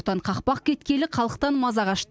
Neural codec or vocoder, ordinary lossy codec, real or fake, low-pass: none; none; real; none